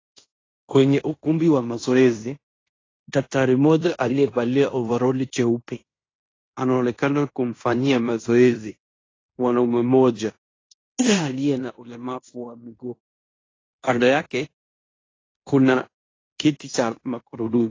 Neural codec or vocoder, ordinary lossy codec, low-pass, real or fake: codec, 16 kHz in and 24 kHz out, 0.9 kbps, LongCat-Audio-Codec, fine tuned four codebook decoder; AAC, 32 kbps; 7.2 kHz; fake